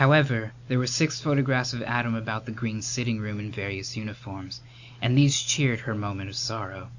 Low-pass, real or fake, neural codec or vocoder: 7.2 kHz; real; none